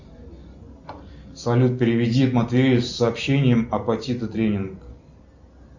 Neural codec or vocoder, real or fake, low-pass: none; real; 7.2 kHz